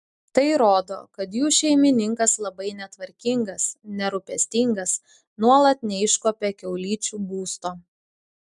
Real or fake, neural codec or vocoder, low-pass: real; none; 10.8 kHz